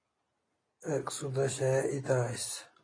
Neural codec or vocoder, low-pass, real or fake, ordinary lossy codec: none; 9.9 kHz; real; AAC, 32 kbps